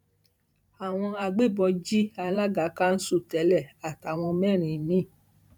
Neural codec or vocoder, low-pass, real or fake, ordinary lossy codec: vocoder, 44.1 kHz, 128 mel bands every 512 samples, BigVGAN v2; 19.8 kHz; fake; none